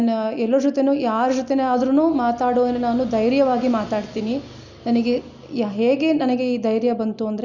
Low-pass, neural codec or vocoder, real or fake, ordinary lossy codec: 7.2 kHz; none; real; Opus, 64 kbps